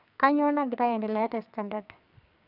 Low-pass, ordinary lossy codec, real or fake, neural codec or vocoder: 5.4 kHz; none; fake; codec, 44.1 kHz, 2.6 kbps, SNAC